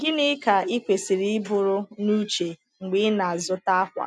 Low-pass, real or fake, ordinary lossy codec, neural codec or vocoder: none; real; none; none